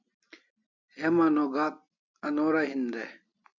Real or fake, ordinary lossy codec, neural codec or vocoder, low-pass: real; MP3, 64 kbps; none; 7.2 kHz